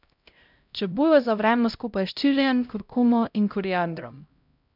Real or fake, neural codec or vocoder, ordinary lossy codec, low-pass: fake; codec, 16 kHz, 0.5 kbps, X-Codec, HuBERT features, trained on LibriSpeech; none; 5.4 kHz